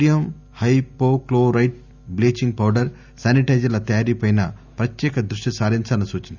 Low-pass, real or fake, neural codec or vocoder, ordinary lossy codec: 7.2 kHz; real; none; none